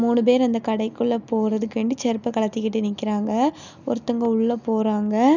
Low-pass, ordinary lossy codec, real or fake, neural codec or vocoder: 7.2 kHz; none; real; none